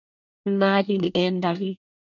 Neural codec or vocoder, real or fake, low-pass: codec, 24 kHz, 1 kbps, SNAC; fake; 7.2 kHz